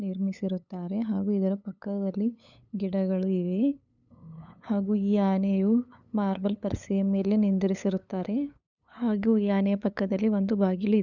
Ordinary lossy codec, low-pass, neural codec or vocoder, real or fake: none; 7.2 kHz; codec, 16 kHz, 8 kbps, FunCodec, trained on LibriTTS, 25 frames a second; fake